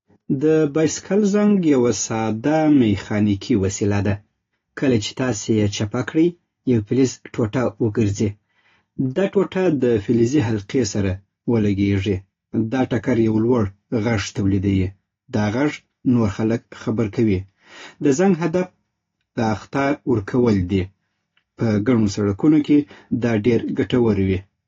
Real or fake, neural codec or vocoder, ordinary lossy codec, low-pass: real; none; AAC, 32 kbps; 7.2 kHz